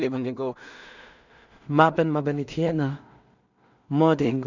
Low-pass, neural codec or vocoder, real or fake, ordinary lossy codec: 7.2 kHz; codec, 16 kHz in and 24 kHz out, 0.4 kbps, LongCat-Audio-Codec, two codebook decoder; fake; none